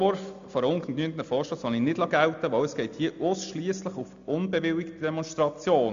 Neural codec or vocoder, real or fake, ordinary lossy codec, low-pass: none; real; AAC, 64 kbps; 7.2 kHz